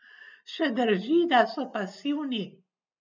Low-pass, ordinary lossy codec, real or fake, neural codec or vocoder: 7.2 kHz; none; real; none